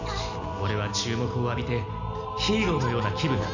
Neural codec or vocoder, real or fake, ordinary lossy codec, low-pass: none; real; none; 7.2 kHz